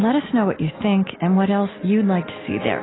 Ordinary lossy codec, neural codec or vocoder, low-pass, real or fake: AAC, 16 kbps; none; 7.2 kHz; real